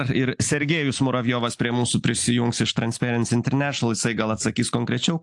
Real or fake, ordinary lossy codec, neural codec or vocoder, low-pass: real; AAC, 64 kbps; none; 10.8 kHz